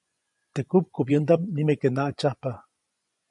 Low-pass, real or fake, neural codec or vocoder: 10.8 kHz; fake; vocoder, 24 kHz, 100 mel bands, Vocos